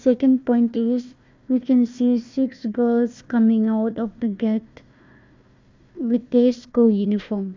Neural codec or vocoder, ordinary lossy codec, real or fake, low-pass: codec, 16 kHz, 1 kbps, FunCodec, trained on Chinese and English, 50 frames a second; MP3, 64 kbps; fake; 7.2 kHz